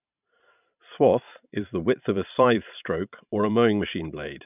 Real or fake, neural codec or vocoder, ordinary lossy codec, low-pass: fake; vocoder, 44.1 kHz, 128 mel bands every 512 samples, BigVGAN v2; none; 3.6 kHz